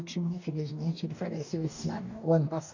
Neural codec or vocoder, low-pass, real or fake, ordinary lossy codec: codec, 44.1 kHz, 2.6 kbps, DAC; 7.2 kHz; fake; none